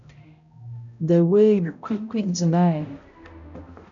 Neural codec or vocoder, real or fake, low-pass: codec, 16 kHz, 0.5 kbps, X-Codec, HuBERT features, trained on balanced general audio; fake; 7.2 kHz